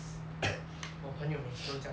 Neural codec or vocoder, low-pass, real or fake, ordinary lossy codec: none; none; real; none